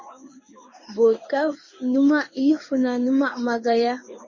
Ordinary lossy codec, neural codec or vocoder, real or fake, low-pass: MP3, 32 kbps; codec, 16 kHz in and 24 kHz out, 1 kbps, XY-Tokenizer; fake; 7.2 kHz